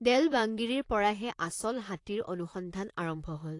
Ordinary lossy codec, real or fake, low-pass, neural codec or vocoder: AAC, 48 kbps; fake; 10.8 kHz; vocoder, 44.1 kHz, 128 mel bands, Pupu-Vocoder